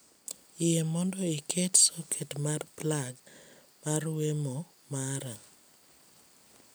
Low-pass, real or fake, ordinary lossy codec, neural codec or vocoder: none; real; none; none